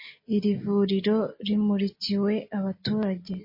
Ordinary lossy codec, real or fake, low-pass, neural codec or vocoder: MP3, 24 kbps; real; 5.4 kHz; none